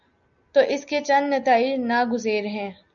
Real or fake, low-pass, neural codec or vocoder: real; 7.2 kHz; none